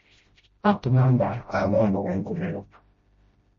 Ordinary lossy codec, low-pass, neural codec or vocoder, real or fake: MP3, 32 kbps; 7.2 kHz; codec, 16 kHz, 0.5 kbps, FreqCodec, smaller model; fake